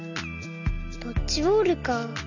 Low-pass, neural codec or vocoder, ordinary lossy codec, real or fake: 7.2 kHz; none; none; real